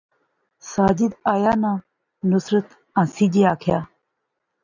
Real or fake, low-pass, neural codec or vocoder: real; 7.2 kHz; none